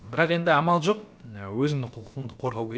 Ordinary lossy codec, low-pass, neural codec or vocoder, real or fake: none; none; codec, 16 kHz, about 1 kbps, DyCAST, with the encoder's durations; fake